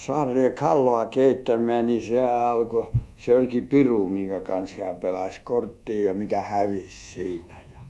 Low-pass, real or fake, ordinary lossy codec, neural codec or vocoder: none; fake; none; codec, 24 kHz, 1.2 kbps, DualCodec